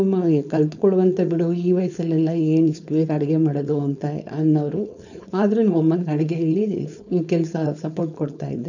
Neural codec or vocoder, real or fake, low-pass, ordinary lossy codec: codec, 16 kHz, 4.8 kbps, FACodec; fake; 7.2 kHz; none